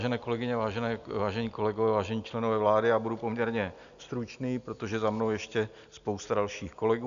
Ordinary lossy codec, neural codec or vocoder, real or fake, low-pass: MP3, 96 kbps; none; real; 7.2 kHz